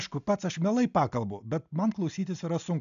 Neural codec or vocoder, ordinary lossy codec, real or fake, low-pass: none; AAC, 96 kbps; real; 7.2 kHz